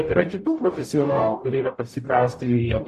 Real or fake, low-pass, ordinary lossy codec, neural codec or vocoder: fake; 14.4 kHz; AAC, 64 kbps; codec, 44.1 kHz, 0.9 kbps, DAC